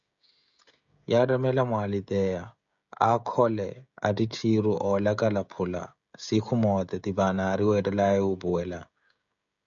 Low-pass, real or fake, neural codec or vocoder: 7.2 kHz; fake; codec, 16 kHz, 16 kbps, FreqCodec, smaller model